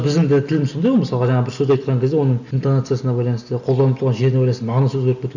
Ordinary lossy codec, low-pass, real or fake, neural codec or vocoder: none; 7.2 kHz; real; none